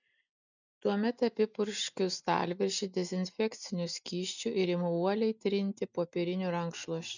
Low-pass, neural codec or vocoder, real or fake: 7.2 kHz; none; real